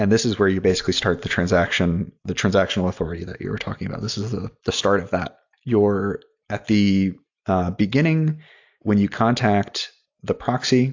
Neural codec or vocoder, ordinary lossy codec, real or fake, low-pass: none; AAC, 48 kbps; real; 7.2 kHz